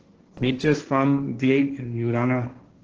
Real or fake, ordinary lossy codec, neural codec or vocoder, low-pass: fake; Opus, 16 kbps; codec, 16 kHz, 1.1 kbps, Voila-Tokenizer; 7.2 kHz